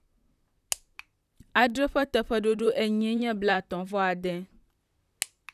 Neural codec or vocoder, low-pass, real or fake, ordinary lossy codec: vocoder, 44.1 kHz, 128 mel bands, Pupu-Vocoder; 14.4 kHz; fake; none